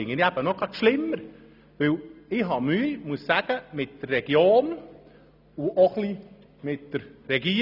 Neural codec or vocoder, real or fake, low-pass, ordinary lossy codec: none; real; 5.4 kHz; none